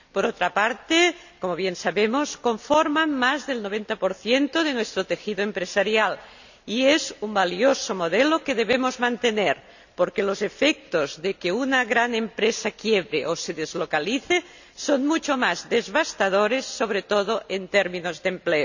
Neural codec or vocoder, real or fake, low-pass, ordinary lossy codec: none; real; 7.2 kHz; none